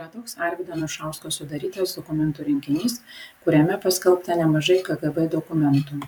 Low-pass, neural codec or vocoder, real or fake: 19.8 kHz; vocoder, 44.1 kHz, 128 mel bands every 512 samples, BigVGAN v2; fake